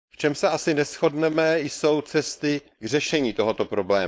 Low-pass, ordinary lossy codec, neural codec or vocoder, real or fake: none; none; codec, 16 kHz, 4.8 kbps, FACodec; fake